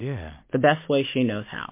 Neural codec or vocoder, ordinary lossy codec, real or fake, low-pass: none; MP3, 24 kbps; real; 3.6 kHz